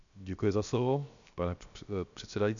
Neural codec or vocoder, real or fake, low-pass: codec, 16 kHz, 0.7 kbps, FocalCodec; fake; 7.2 kHz